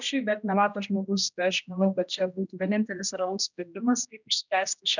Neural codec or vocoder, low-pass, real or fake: codec, 16 kHz, 1 kbps, X-Codec, HuBERT features, trained on general audio; 7.2 kHz; fake